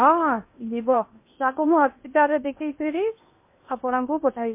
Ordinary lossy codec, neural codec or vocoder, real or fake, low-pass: MP3, 24 kbps; codec, 16 kHz in and 24 kHz out, 0.8 kbps, FocalCodec, streaming, 65536 codes; fake; 3.6 kHz